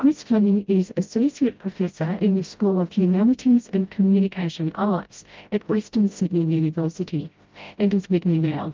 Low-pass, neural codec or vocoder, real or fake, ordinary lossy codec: 7.2 kHz; codec, 16 kHz, 0.5 kbps, FreqCodec, smaller model; fake; Opus, 32 kbps